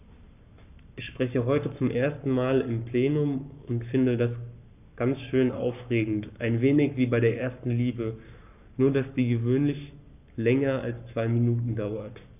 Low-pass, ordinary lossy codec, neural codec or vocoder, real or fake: 3.6 kHz; none; codec, 44.1 kHz, 7.8 kbps, Pupu-Codec; fake